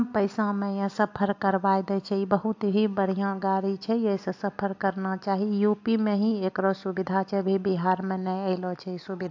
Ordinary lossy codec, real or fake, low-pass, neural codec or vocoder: AAC, 48 kbps; real; 7.2 kHz; none